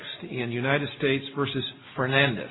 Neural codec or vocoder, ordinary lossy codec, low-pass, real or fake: none; AAC, 16 kbps; 7.2 kHz; real